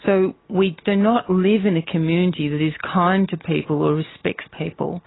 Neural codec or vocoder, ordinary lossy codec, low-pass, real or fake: none; AAC, 16 kbps; 7.2 kHz; real